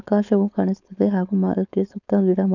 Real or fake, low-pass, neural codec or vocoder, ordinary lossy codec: fake; 7.2 kHz; codec, 16 kHz, 4.8 kbps, FACodec; none